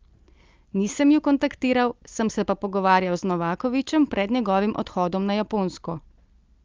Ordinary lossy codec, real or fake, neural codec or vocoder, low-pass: Opus, 24 kbps; real; none; 7.2 kHz